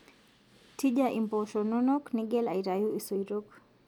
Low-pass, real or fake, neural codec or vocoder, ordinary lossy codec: 19.8 kHz; real; none; none